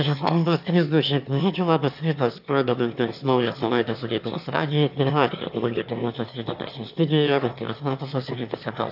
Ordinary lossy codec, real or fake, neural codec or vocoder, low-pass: AAC, 48 kbps; fake; autoencoder, 22.05 kHz, a latent of 192 numbers a frame, VITS, trained on one speaker; 5.4 kHz